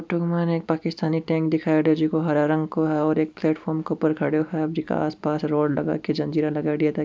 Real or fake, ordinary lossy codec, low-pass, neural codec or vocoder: real; none; none; none